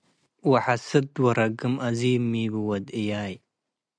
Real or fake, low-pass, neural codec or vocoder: real; 9.9 kHz; none